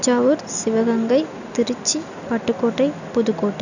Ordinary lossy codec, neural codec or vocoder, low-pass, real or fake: none; none; 7.2 kHz; real